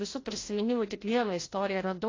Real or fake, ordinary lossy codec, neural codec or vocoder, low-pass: fake; AAC, 32 kbps; codec, 16 kHz, 0.5 kbps, FreqCodec, larger model; 7.2 kHz